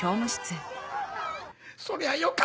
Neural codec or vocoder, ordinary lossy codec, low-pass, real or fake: none; none; none; real